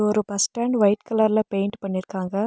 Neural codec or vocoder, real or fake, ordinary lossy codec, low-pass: none; real; none; none